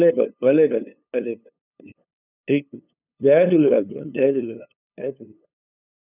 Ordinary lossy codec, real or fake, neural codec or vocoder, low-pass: none; fake; codec, 16 kHz, 2 kbps, FunCodec, trained on LibriTTS, 25 frames a second; 3.6 kHz